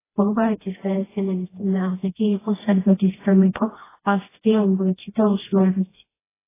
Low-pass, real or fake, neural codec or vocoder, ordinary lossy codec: 3.6 kHz; fake; codec, 16 kHz, 1 kbps, FreqCodec, smaller model; AAC, 16 kbps